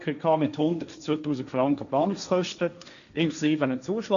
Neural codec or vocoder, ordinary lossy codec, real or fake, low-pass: codec, 16 kHz, 1.1 kbps, Voila-Tokenizer; none; fake; 7.2 kHz